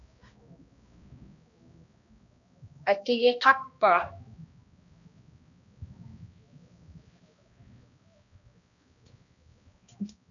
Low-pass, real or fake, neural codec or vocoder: 7.2 kHz; fake; codec, 16 kHz, 1 kbps, X-Codec, HuBERT features, trained on balanced general audio